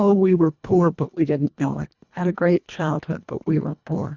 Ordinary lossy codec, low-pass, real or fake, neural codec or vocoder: Opus, 64 kbps; 7.2 kHz; fake; codec, 24 kHz, 1.5 kbps, HILCodec